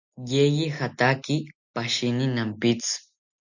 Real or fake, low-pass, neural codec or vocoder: real; 7.2 kHz; none